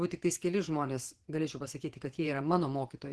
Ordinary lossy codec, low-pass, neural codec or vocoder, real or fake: Opus, 16 kbps; 10.8 kHz; vocoder, 24 kHz, 100 mel bands, Vocos; fake